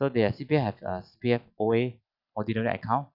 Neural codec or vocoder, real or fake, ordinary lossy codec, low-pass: none; real; none; 5.4 kHz